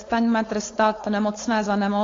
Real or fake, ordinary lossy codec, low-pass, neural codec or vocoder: fake; AAC, 32 kbps; 7.2 kHz; codec, 16 kHz, 4.8 kbps, FACodec